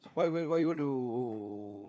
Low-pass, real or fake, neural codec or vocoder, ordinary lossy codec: none; fake; codec, 16 kHz, 4 kbps, FunCodec, trained on LibriTTS, 50 frames a second; none